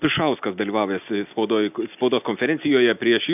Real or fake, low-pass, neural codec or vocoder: real; 3.6 kHz; none